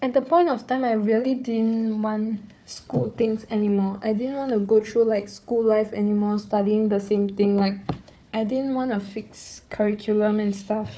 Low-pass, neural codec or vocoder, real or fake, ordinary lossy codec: none; codec, 16 kHz, 4 kbps, FunCodec, trained on Chinese and English, 50 frames a second; fake; none